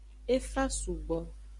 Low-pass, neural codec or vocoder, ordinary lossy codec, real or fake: 10.8 kHz; none; MP3, 64 kbps; real